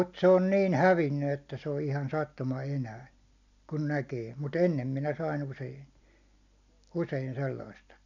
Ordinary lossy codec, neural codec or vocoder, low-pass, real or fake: none; none; 7.2 kHz; real